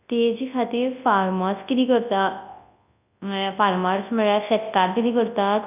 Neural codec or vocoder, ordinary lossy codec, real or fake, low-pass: codec, 24 kHz, 0.9 kbps, WavTokenizer, large speech release; none; fake; 3.6 kHz